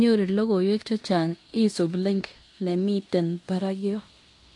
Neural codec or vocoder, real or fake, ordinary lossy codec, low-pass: codec, 16 kHz in and 24 kHz out, 0.9 kbps, LongCat-Audio-Codec, fine tuned four codebook decoder; fake; AAC, 64 kbps; 10.8 kHz